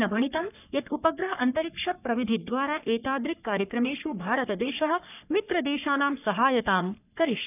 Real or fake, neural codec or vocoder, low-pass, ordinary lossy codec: fake; codec, 44.1 kHz, 3.4 kbps, Pupu-Codec; 3.6 kHz; none